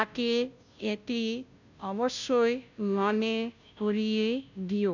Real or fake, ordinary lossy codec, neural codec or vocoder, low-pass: fake; none; codec, 16 kHz, 0.5 kbps, FunCodec, trained on Chinese and English, 25 frames a second; 7.2 kHz